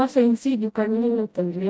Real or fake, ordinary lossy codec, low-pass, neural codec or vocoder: fake; none; none; codec, 16 kHz, 0.5 kbps, FreqCodec, smaller model